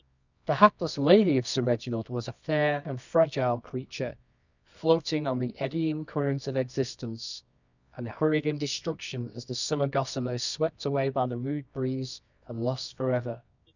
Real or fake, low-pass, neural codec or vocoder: fake; 7.2 kHz; codec, 24 kHz, 0.9 kbps, WavTokenizer, medium music audio release